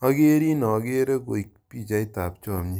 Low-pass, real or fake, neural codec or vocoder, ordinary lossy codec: none; fake; vocoder, 44.1 kHz, 128 mel bands every 256 samples, BigVGAN v2; none